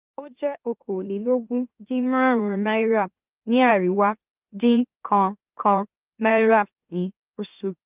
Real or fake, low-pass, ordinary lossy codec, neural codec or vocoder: fake; 3.6 kHz; Opus, 24 kbps; autoencoder, 44.1 kHz, a latent of 192 numbers a frame, MeloTTS